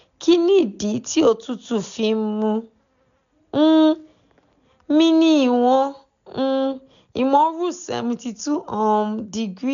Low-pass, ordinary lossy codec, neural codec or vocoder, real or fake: 7.2 kHz; none; none; real